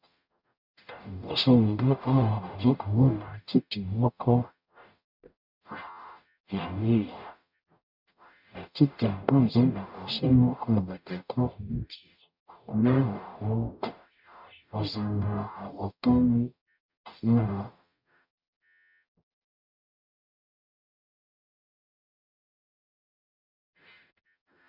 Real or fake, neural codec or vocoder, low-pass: fake; codec, 44.1 kHz, 0.9 kbps, DAC; 5.4 kHz